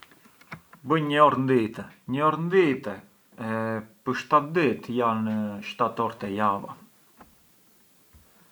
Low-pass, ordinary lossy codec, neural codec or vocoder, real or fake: none; none; none; real